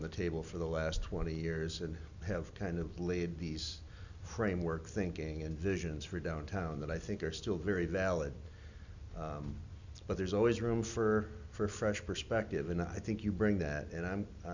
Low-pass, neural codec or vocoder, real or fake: 7.2 kHz; none; real